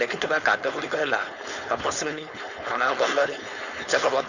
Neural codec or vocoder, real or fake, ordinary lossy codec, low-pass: codec, 16 kHz, 4.8 kbps, FACodec; fake; none; 7.2 kHz